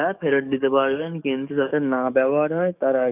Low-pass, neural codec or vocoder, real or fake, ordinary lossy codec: 3.6 kHz; codec, 44.1 kHz, 7.8 kbps, DAC; fake; none